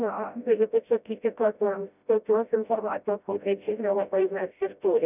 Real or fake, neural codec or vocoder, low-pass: fake; codec, 16 kHz, 0.5 kbps, FreqCodec, smaller model; 3.6 kHz